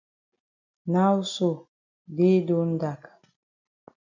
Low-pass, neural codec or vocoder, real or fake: 7.2 kHz; none; real